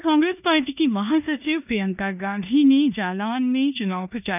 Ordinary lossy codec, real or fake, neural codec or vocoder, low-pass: none; fake; codec, 16 kHz in and 24 kHz out, 0.9 kbps, LongCat-Audio-Codec, four codebook decoder; 3.6 kHz